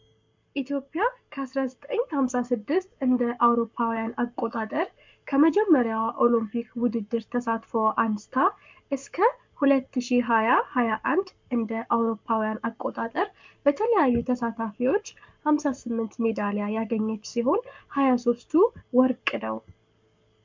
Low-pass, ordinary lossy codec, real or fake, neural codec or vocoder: 7.2 kHz; MP3, 64 kbps; fake; codec, 44.1 kHz, 7.8 kbps, Pupu-Codec